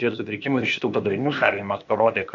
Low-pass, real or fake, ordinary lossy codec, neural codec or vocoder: 7.2 kHz; fake; MP3, 64 kbps; codec, 16 kHz, 0.8 kbps, ZipCodec